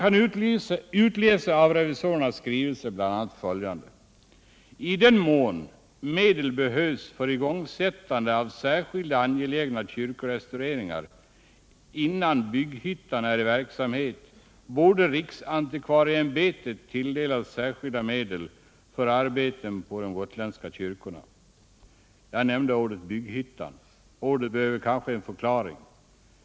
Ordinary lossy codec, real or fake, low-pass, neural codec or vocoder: none; real; none; none